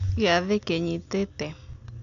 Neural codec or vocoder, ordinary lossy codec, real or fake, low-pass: none; none; real; 7.2 kHz